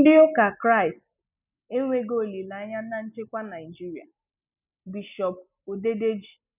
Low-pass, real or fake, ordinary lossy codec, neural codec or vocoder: 3.6 kHz; real; none; none